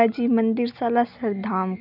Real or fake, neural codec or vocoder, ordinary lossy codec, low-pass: real; none; none; 5.4 kHz